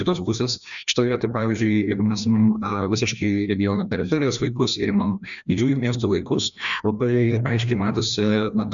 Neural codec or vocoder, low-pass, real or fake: codec, 16 kHz, 1 kbps, FreqCodec, larger model; 7.2 kHz; fake